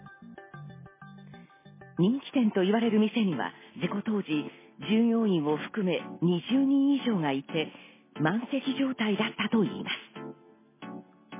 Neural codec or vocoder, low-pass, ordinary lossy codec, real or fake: none; 3.6 kHz; MP3, 16 kbps; real